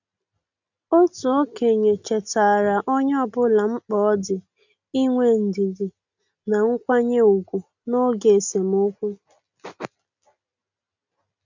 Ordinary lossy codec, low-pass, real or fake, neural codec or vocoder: none; 7.2 kHz; real; none